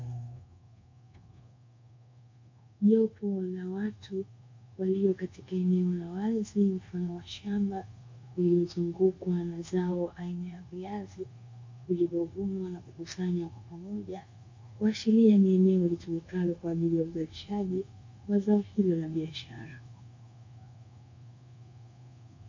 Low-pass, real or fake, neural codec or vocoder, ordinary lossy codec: 7.2 kHz; fake; codec, 24 kHz, 1.2 kbps, DualCodec; MP3, 48 kbps